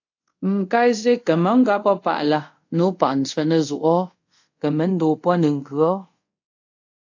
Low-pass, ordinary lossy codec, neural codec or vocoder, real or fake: 7.2 kHz; AAC, 48 kbps; codec, 24 kHz, 0.5 kbps, DualCodec; fake